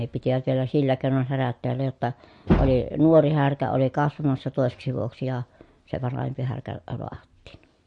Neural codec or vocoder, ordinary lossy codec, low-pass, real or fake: none; MP3, 48 kbps; 10.8 kHz; real